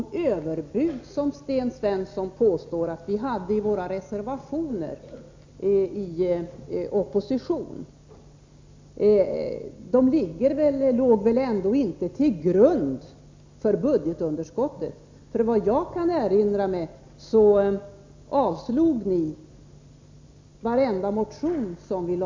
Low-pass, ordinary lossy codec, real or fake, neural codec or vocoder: 7.2 kHz; MP3, 64 kbps; real; none